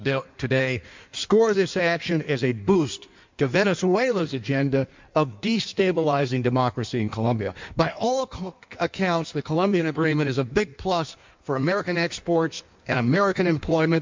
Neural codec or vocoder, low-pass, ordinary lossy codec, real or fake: codec, 16 kHz in and 24 kHz out, 1.1 kbps, FireRedTTS-2 codec; 7.2 kHz; MP3, 64 kbps; fake